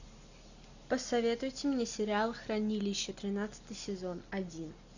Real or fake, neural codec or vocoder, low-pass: real; none; 7.2 kHz